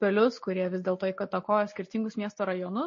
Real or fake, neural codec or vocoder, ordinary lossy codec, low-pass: real; none; MP3, 32 kbps; 7.2 kHz